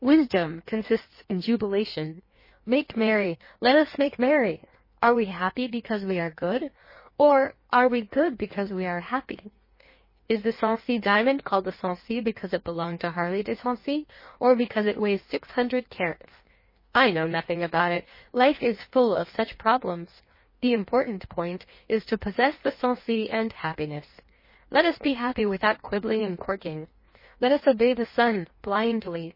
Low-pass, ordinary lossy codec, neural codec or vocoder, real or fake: 5.4 kHz; MP3, 24 kbps; codec, 16 kHz in and 24 kHz out, 1.1 kbps, FireRedTTS-2 codec; fake